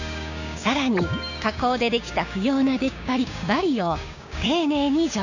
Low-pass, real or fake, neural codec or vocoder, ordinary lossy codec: 7.2 kHz; fake; codec, 16 kHz, 6 kbps, DAC; none